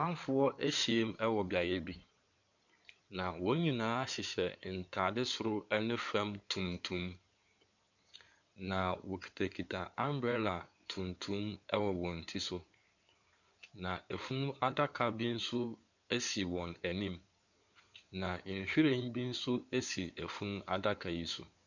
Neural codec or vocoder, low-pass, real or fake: codec, 16 kHz in and 24 kHz out, 2.2 kbps, FireRedTTS-2 codec; 7.2 kHz; fake